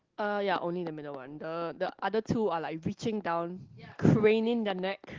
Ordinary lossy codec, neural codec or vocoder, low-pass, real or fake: Opus, 16 kbps; none; 7.2 kHz; real